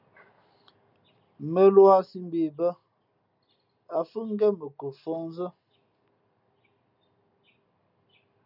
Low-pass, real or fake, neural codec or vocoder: 5.4 kHz; real; none